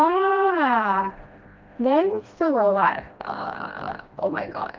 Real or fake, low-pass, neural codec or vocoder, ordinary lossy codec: fake; 7.2 kHz; codec, 16 kHz, 1 kbps, FreqCodec, smaller model; Opus, 24 kbps